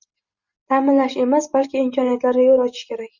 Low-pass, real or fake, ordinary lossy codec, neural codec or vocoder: 7.2 kHz; real; Opus, 64 kbps; none